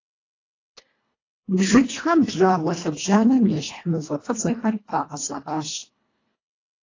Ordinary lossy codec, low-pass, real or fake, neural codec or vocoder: AAC, 32 kbps; 7.2 kHz; fake; codec, 24 kHz, 1.5 kbps, HILCodec